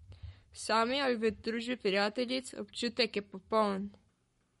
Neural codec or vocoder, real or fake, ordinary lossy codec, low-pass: codec, 44.1 kHz, 7.8 kbps, Pupu-Codec; fake; MP3, 48 kbps; 19.8 kHz